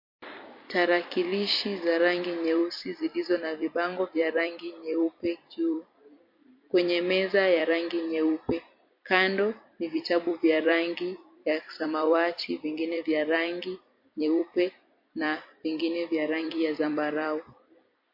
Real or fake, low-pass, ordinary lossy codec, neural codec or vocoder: fake; 5.4 kHz; MP3, 32 kbps; vocoder, 44.1 kHz, 128 mel bands every 256 samples, BigVGAN v2